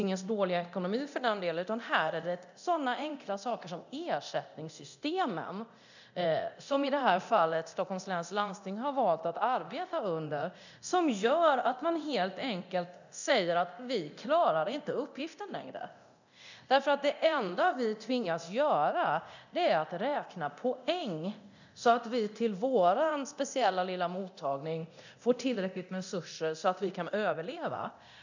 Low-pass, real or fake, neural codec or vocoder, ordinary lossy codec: 7.2 kHz; fake; codec, 24 kHz, 0.9 kbps, DualCodec; none